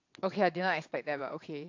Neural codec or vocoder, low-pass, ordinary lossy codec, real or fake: vocoder, 22.05 kHz, 80 mel bands, WaveNeXt; 7.2 kHz; AAC, 48 kbps; fake